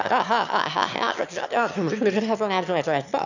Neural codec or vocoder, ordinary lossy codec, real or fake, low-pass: autoencoder, 22.05 kHz, a latent of 192 numbers a frame, VITS, trained on one speaker; none; fake; 7.2 kHz